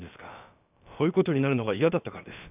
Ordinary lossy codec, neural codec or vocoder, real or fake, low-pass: none; codec, 16 kHz, about 1 kbps, DyCAST, with the encoder's durations; fake; 3.6 kHz